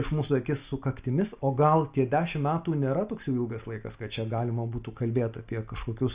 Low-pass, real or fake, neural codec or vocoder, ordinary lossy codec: 3.6 kHz; real; none; Opus, 64 kbps